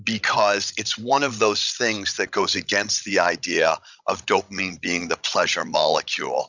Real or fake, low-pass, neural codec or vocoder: fake; 7.2 kHz; codec, 16 kHz, 16 kbps, FreqCodec, larger model